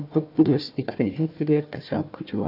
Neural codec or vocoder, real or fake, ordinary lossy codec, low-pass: codec, 16 kHz, 1 kbps, FunCodec, trained on Chinese and English, 50 frames a second; fake; none; 5.4 kHz